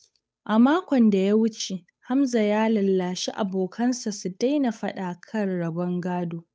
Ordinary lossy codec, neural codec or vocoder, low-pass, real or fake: none; codec, 16 kHz, 8 kbps, FunCodec, trained on Chinese and English, 25 frames a second; none; fake